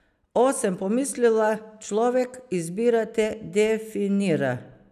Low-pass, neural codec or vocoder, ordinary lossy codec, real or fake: 14.4 kHz; none; none; real